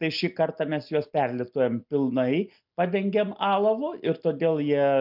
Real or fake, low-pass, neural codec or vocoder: real; 5.4 kHz; none